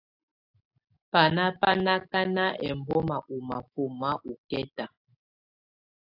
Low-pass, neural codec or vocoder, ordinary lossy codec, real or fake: 5.4 kHz; none; AAC, 48 kbps; real